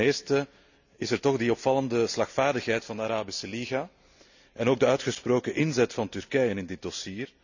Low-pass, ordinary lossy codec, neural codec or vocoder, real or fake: 7.2 kHz; none; none; real